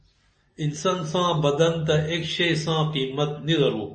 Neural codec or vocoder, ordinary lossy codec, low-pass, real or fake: none; MP3, 32 kbps; 10.8 kHz; real